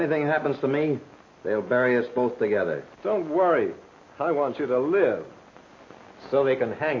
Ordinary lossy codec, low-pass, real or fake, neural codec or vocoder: MP3, 32 kbps; 7.2 kHz; real; none